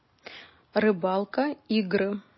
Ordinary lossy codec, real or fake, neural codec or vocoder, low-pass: MP3, 24 kbps; real; none; 7.2 kHz